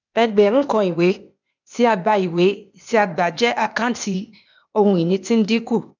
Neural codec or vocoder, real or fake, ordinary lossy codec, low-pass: codec, 16 kHz, 0.8 kbps, ZipCodec; fake; none; 7.2 kHz